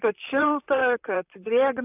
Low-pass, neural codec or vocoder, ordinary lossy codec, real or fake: 3.6 kHz; vocoder, 44.1 kHz, 128 mel bands, Pupu-Vocoder; AAC, 32 kbps; fake